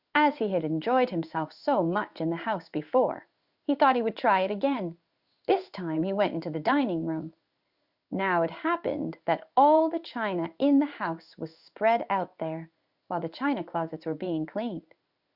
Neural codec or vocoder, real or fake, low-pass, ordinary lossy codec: codec, 16 kHz in and 24 kHz out, 1 kbps, XY-Tokenizer; fake; 5.4 kHz; Opus, 64 kbps